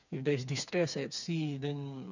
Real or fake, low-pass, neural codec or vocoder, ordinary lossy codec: fake; 7.2 kHz; codec, 16 kHz, 4 kbps, FreqCodec, smaller model; none